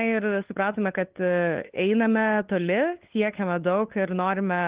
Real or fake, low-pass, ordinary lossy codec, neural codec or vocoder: fake; 3.6 kHz; Opus, 16 kbps; codec, 16 kHz, 8 kbps, FunCodec, trained on LibriTTS, 25 frames a second